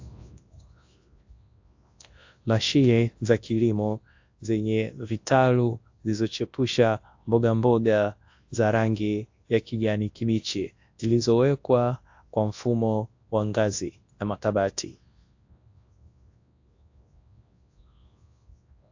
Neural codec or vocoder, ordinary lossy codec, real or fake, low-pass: codec, 24 kHz, 0.9 kbps, WavTokenizer, large speech release; AAC, 48 kbps; fake; 7.2 kHz